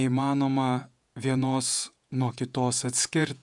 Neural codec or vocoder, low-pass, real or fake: vocoder, 48 kHz, 128 mel bands, Vocos; 10.8 kHz; fake